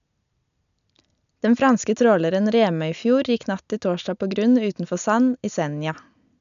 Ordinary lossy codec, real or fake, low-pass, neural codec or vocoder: none; real; 7.2 kHz; none